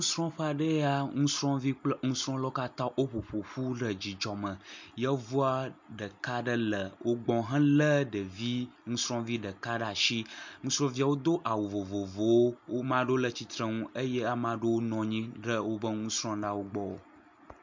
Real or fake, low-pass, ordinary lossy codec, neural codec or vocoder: real; 7.2 kHz; MP3, 64 kbps; none